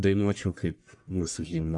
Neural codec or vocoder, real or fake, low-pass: codec, 44.1 kHz, 1.7 kbps, Pupu-Codec; fake; 10.8 kHz